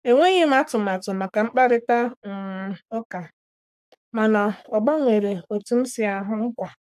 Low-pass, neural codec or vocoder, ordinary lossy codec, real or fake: 14.4 kHz; codec, 44.1 kHz, 3.4 kbps, Pupu-Codec; AAC, 96 kbps; fake